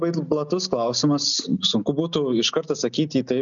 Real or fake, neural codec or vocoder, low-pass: real; none; 7.2 kHz